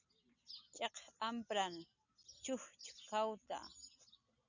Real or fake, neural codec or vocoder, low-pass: real; none; 7.2 kHz